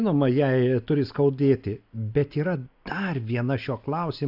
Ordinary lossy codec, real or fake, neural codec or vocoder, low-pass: AAC, 48 kbps; real; none; 5.4 kHz